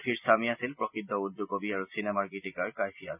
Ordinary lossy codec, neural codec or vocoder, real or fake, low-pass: none; none; real; 3.6 kHz